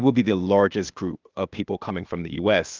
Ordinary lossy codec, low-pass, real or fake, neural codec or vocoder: Opus, 24 kbps; 7.2 kHz; fake; codec, 16 kHz, 0.8 kbps, ZipCodec